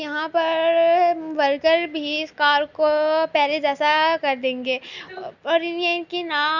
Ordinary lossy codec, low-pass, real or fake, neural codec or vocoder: none; 7.2 kHz; real; none